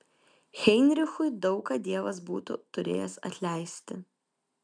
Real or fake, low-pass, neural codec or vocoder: real; 9.9 kHz; none